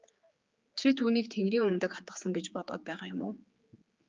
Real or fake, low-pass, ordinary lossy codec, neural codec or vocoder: fake; 7.2 kHz; Opus, 24 kbps; codec, 16 kHz, 4 kbps, X-Codec, HuBERT features, trained on general audio